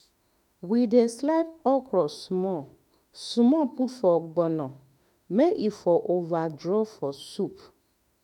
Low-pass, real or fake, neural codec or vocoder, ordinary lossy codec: 19.8 kHz; fake; autoencoder, 48 kHz, 32 numbers a frame, DAC-VAE, trained on Japanese speech; none